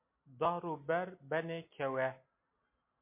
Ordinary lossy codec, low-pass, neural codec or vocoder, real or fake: MP3, 24 kbps; 3.6 kHz; none; real